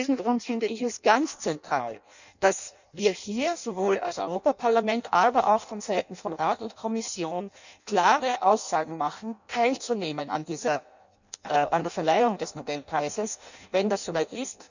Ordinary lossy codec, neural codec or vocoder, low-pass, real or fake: none; codec, 16 kHz in and 24 kHz out, 0.6 kbps, FireRedTTS-2 codec; 7.2 kHz; fake